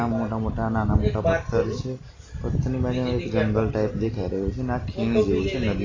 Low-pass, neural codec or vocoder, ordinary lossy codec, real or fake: 7.2 kHz; none; AAC, 32 kbps; real